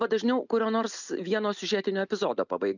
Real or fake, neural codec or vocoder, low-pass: real; none; 7.2 kHz